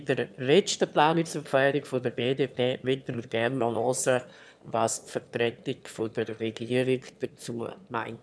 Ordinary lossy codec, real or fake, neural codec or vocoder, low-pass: none; fake; autoencoder, 22.05 kHz, a latent of 192 numbers a frame, VITS, trained on one speaker; none